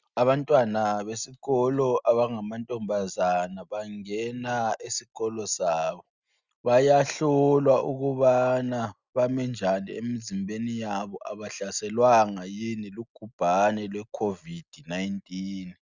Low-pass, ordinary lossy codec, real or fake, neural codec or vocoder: 7.2 kHz; Opus, 64 kbps; real; none